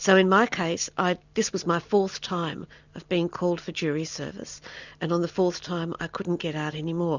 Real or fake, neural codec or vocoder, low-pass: real; none; 7.2 kHz